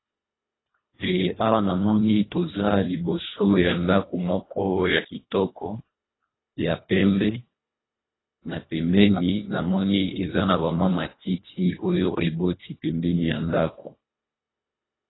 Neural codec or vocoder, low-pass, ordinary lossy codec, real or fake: codec, 24 kHz, 1.5 kbps, HILCodec; 7.2 kHz; AAC, 16 kbps; fake